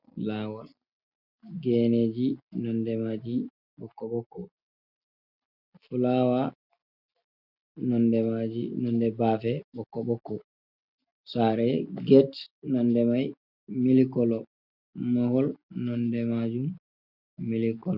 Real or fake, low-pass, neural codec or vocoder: real; 5.4 kHz; none